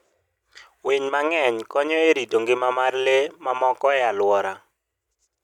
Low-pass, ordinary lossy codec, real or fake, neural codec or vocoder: 19.8 kHz; none; real; none